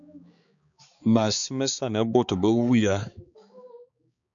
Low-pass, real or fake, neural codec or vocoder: 7.2 kHz; fake; codec, 16 kHz, 4 kbps, X-Codec, HuBERT features, trained on balanced general audio